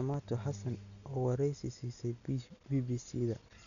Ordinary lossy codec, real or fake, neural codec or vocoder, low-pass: none; real; none; 7.2 kHz